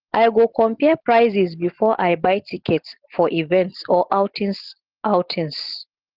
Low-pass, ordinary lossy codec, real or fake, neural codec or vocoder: 5.4 kHz; Opus, 16 kbps; real; none